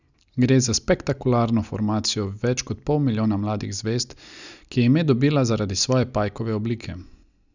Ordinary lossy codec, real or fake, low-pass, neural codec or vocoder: none; real; 7.2 kHz; none